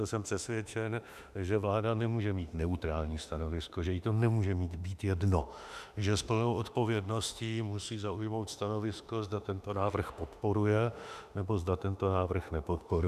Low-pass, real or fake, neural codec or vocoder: 14.4 kHz; fake; autoencoder, 48 kHz, 32 numbers a frame, DAC-VAE, trained on Japanese speech